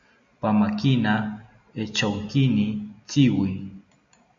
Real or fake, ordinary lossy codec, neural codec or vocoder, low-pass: real; AAC, 64 kbps; none; 7.2 kHz